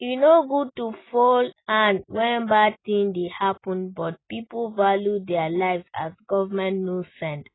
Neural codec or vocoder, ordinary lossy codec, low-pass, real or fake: none; AAC, 16 kbps; 7.2 kHz; real